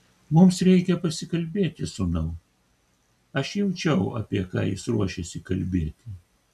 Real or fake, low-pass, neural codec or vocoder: real; 14.4 kHz; none